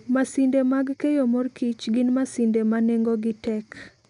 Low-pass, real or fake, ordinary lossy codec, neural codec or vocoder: 10.8 kHz; real; none; none